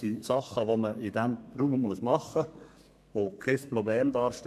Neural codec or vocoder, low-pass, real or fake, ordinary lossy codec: codec, 32 kHz, 1.9 kbps, SNAC; 14.4 kHz; fake; AAC, 96 kbps